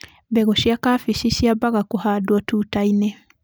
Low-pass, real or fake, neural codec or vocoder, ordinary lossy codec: none; real; none; none